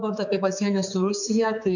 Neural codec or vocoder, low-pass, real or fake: codec, 16 kHz, 4 kbps, X-Codec, HuBERT features, trained on general audio; 7.2 kHz; fake